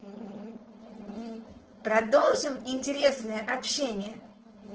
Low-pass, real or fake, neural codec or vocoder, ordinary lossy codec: 7.2 kHz; fake; codec, 16 kHz, 4.8 kbps, FACodec; Opus, 16 kbps